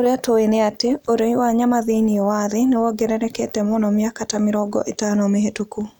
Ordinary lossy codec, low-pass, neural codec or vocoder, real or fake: none; 19.8 kHz; none; real